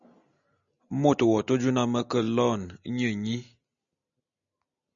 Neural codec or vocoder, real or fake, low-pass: none; real; 7.2 kHz